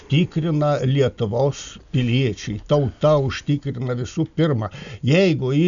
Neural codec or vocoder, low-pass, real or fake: none; 7.2 kHz; real